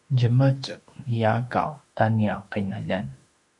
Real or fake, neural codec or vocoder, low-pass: fake; autoencoder, 48 kHz, 32 numbers a frame, DAC-VAE, trained on Japanese speech; 10.8 kHz